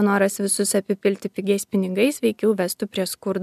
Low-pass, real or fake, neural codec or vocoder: 14.4 kHz; real; none